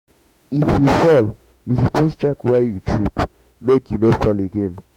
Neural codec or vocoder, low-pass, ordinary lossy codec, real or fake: autoencoder, 48 kHz, 32 numbers a frame, DAC-VAE, trained on Japanese speech; 19.8 kHz; none; fake